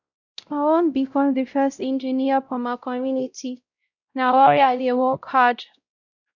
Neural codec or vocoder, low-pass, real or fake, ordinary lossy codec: codec, 16 kHz, 0.5 kbps, X-Codec, WavLM features, trained on Multilingual LibriSpeech; 7.2 kHz; fake; none